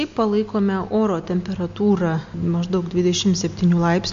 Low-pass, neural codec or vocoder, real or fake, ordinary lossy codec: 7.2 kHz; none; real; MP3, 48 kbps